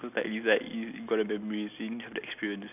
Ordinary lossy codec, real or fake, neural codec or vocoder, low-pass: none; real; none; 3.6 kHz